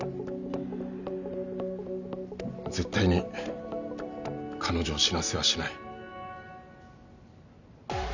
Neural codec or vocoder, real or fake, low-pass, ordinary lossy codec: none; real; 7.2 kHz; none